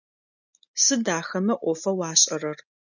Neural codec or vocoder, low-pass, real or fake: none; 7.2 kHz; real